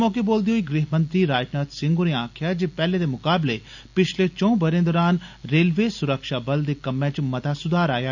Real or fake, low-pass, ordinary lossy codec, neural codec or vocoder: real; 7.2 kHz; none; none